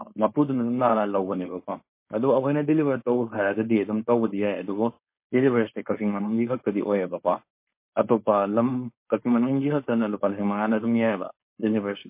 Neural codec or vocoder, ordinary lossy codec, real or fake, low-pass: codec, 16 kHz, 4.8 kbps, FACodec; MP3, 24 kbps; fake; 3.6 kHz